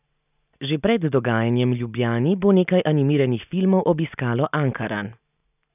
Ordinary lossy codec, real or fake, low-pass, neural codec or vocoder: none; real; 3.6 kHz; none